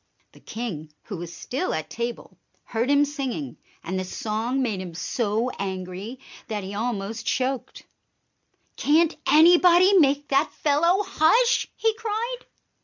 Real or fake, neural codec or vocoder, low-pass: real; none; 7.2 kHz